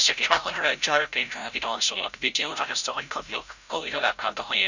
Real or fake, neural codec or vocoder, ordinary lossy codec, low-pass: fake; codec, 16 kHz, 0.5 kbps, FreqCodec, larger model; none; 7.2 kHz